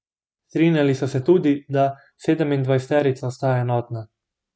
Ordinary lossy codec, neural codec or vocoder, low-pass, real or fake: none; none; none; real